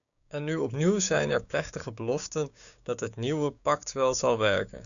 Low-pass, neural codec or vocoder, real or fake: 7.2 kHz; codec, 16 kHz, 6 kbps, DAC; fake